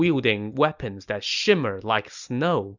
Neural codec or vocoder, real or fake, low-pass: none; real; 7.2 kHz